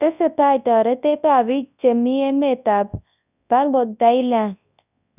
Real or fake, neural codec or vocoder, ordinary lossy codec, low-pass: fake; codec, 24 kHz, 0.9 kbps, WavTokenizer, large speech release; none; 3.6 kHz